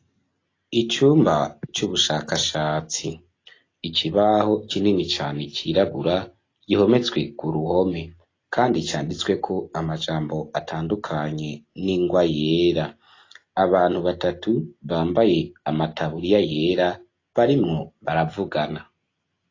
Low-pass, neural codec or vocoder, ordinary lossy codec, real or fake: 7.2 kHz; none; AAC, 32 kbps; real